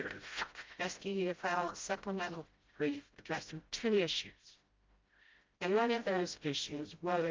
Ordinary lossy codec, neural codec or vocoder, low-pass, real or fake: Opus, 24 kbps; codec, 16 kHz, 0.5 kbps, FreqCodec, smaller model; 7.2 kHz; fake